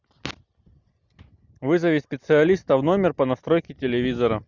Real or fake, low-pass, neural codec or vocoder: real; 7.2 kHz; none